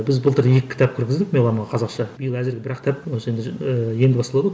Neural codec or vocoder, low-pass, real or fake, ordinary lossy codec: none; none; real; none